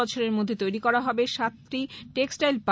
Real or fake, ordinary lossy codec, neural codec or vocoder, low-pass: real; none; none; none